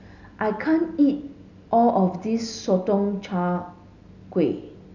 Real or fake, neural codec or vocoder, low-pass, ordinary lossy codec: real; none; 7.2 kHz; none